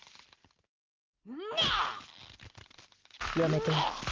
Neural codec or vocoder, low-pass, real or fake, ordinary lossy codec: none; 7.2 kHz; real; Opus, 16 kbps